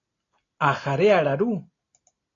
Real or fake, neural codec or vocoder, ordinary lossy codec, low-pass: real; none; AAC, 32 kbps; 7.2 kHz